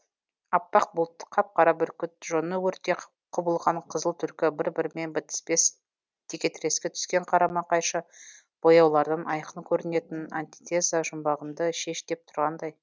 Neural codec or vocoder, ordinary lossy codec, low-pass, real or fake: none; none; none; real